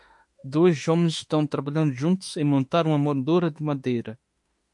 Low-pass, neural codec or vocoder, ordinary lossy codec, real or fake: 10.8 kHz; autoencoder, 48 kHz, 32 numbers a frame, DAC-VAE, trained on Japanese speech; MP3, 48 kbps; fake